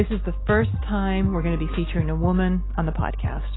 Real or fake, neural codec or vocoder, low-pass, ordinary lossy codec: real; none; 7.2 kHz; AAC, 16 kbps